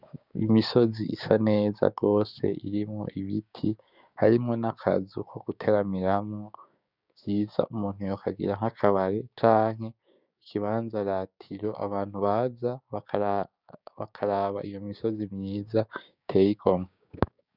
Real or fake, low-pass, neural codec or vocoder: fake; 5.4 kHz; codec, 16 kHz, 6 kbps, DAC